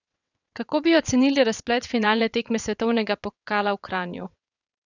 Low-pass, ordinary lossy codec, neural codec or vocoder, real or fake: 7.2 kHz; none; vocoder, 22.05 kHz, 80 mel bands, WaveNeXt; fake